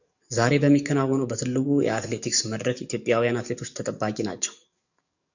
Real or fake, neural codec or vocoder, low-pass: fake; codec, 44.1 kHz, 7.8 kbps, DAC; 7.2 kHz